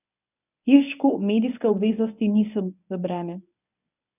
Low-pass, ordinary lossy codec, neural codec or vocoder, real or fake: 3.6 kHz; none; codec, 24 kHz, 0.9 kbps, WavTokenizer, medium speech release version 1; fake